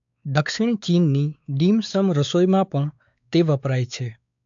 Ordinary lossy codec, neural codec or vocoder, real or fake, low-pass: AAC, 64 kbps; codec, 16 kHz, 4 kbps, X-Codec, WavLM features, trained on Multilingual LibriSpeech; fake; 7.2 kHz